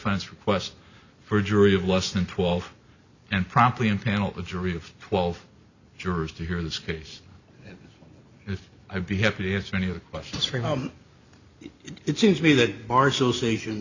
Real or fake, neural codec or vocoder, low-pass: real; none; 7.2 kHz